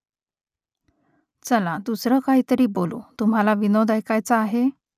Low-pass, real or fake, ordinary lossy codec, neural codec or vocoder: 14.4 kHz; real; none; none